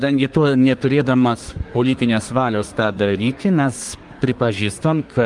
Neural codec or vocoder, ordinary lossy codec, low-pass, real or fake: codec, 24 kHz, 1 kbps, SNAC; Opus, 32 kbps; 10.8 kHz; fake